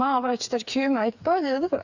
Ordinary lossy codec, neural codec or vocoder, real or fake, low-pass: none; codec, 16 kHz, 4 kbps, FreqCodec, smaller model; fake; 7.2 kHz